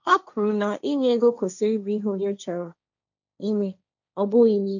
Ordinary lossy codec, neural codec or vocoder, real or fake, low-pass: none; codec, 16 kHz, 1.1 kbps, Voila-Tokenizer; fake; 7.2 kHz